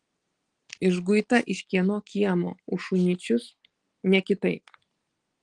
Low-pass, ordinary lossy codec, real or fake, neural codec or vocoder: 10.8 kHz; Opus, 24 kbps; real; none